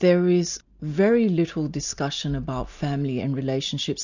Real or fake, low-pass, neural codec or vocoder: real; 7.2 kHz; none